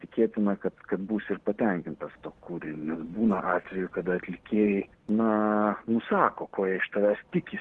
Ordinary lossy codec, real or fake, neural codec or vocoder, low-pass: Opus, 16 kbps; real; none; 10.8 kHz